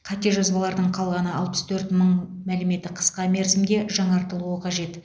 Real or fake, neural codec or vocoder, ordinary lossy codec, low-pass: real; none; none; none